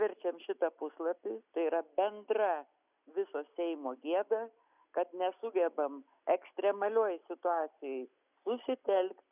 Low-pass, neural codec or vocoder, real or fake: 3.6 kHz; none; real